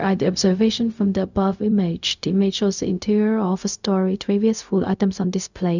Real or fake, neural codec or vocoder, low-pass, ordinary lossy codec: fake; codec, 16 kHz, 0.4 kbps, LongCat-Audio-Codec; 7.2 kHz; none